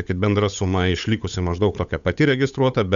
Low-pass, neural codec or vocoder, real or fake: 7.2 kHz; codec, 16 kHz, 8 kbps, FunCodec, trained on Chinese and English, 25 frames a second; fake